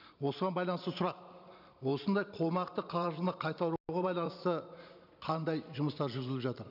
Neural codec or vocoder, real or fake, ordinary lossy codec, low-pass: vocoder, 44.1 kHz, 128 mel bands every 512 samples, BigVGAN v2; fake; none; 5.4 kHz